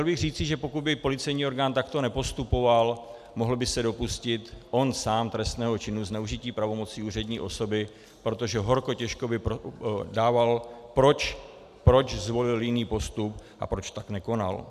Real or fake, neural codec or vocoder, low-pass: real; none; 14.4 kHz